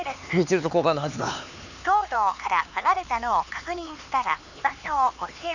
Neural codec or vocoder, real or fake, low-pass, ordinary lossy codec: codec, 16 kHz, 4 kbps, X-Codec, HuBERT features, trained on LibriSpeech; fake; 7.2 kHz; none